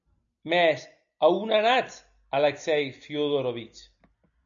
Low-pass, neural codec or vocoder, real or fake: 7.2 kHz; none; real